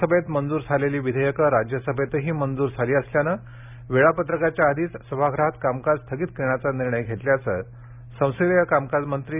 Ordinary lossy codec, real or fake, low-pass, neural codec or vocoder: none; real; 3.6 kHz; none